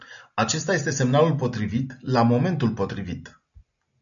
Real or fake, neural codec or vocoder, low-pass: real; none; 7.2 kHz